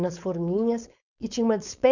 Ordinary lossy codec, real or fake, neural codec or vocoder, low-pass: none; fake; codec, 16 kHz, 4.8 kbps, FACodec; 7.2 kHz